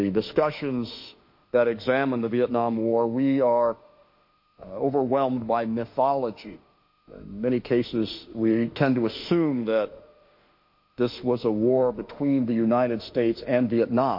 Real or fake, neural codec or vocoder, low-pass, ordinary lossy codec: fake; autoencoder, 48 kHz, 32 numbers a frame, DAC-VAE, trained on Japanese speech; 5.4 kHz; MP3, 32 kbps